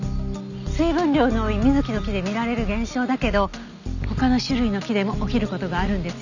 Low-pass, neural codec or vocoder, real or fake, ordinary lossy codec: 7.2 kHz; none; real; none